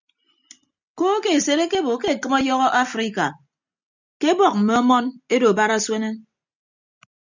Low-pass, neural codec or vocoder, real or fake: 7.2 kHz; none; real